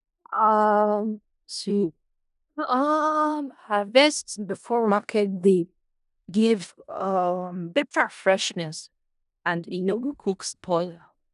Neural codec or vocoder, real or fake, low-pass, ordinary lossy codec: codec, 16 kHz in and 24 kHz out, 0.4 kbps, LongCat-Audio-Codec, four codebook decoder; fake; 10.8 kHz; none